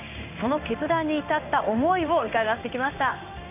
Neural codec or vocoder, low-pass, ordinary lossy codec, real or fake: codec, 16 kHz in and 24 kHz out, 1 kbps, XY-Tokenizer; 3.6 kHz; none; fake